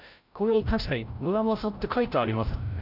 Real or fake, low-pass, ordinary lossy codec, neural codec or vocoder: fake; 5.4 kHz; none; codec, 16 kHz, 0.5 kbps, FreqCodec, larger model